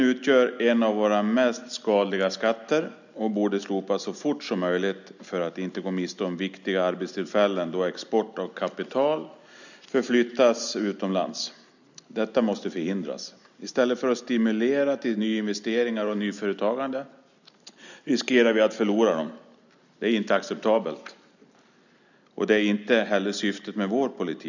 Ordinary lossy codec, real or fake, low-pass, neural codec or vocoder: none; real; 7.2 kHz; none